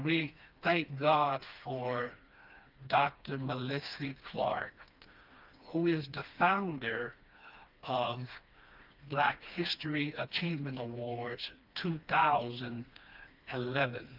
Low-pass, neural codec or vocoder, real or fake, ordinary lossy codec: 5.4 kHz; codec, 16 kHz, 2 kbps, FreqCodec, smaller model; fake; Opus, 24 kbps